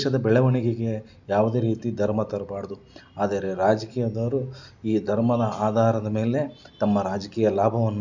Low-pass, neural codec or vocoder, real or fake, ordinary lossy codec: 7.2 kHz; none; real; none